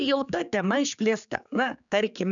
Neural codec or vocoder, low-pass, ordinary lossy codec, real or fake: codec, 16 kHz, 4 kbps, X-Codec, HuBERT features, trained on general audio; 7.2 kHz; MP3, 96 kbps; fake